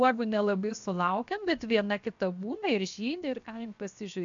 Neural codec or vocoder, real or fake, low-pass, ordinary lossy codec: codec, 16 kHz, 0.7 kbps, FocalCodec; fake; 7.2 kHz; AAC, 64 kbps